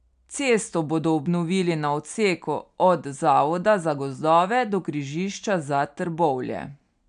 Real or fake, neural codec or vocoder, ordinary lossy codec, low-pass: real; none; MP3, 96 kbps; 9.9 kHz